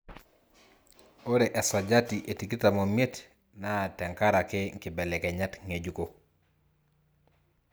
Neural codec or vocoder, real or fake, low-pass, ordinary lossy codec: none; real; none; none